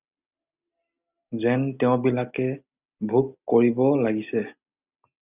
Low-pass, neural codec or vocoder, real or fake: 3.6 kHz; none; real